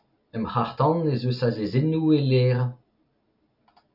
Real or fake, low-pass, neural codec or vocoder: real; 5.4 kHz; none